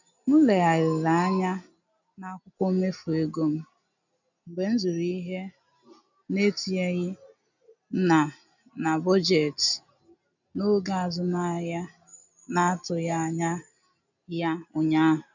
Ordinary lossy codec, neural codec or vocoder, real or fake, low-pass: none; none; real; 7.2 kHz